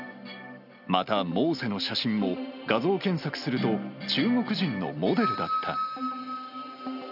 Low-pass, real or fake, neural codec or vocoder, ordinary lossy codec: 5.4 kHz; real; none; none